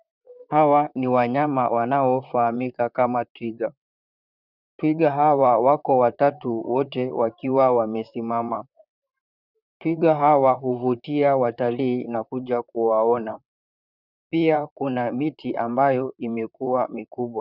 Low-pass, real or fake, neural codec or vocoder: 5.4 kHz; fake; vocoder, 44.1 kHz, 128 mel bands, Pupu-Vocoder